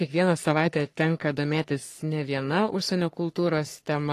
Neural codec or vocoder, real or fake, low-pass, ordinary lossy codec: codec, 44.1 kHz, 3.4 kbps, Pupu-Codec; fake; 14.4 kHz; AAC, 48 kbps